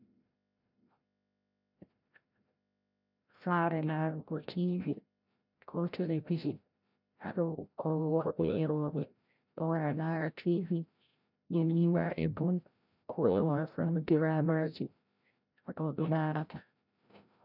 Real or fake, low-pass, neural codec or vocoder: fake; 5.4 kHz; codec, 16 kHz, 0.5 kbps, FreqCodec, larger model